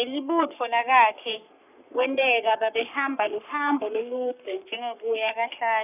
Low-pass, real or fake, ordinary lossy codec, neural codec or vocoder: 3.6 kHz; fake; none; codec, 44.1 kHz, 3.4 kbps, Pupu-Codec